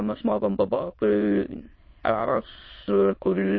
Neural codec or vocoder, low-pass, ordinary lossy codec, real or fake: autoencoder, 22.05 kHz, a latent of 192 numbers a frame, VITS, trained on many speakers; 7.2 kHz; MP3, 24 kbps; fake